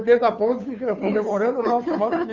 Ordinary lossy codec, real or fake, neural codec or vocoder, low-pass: none; fake; codec, 16 kHz, 2 kbps, FunCodec, trained on Chinese and English, 25 frames a second; 7.2 kHz